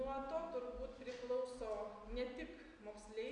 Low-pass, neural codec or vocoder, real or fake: 9.9 kHz; none; real